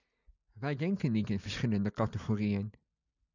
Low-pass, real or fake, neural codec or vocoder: 7.2 kHz; real; none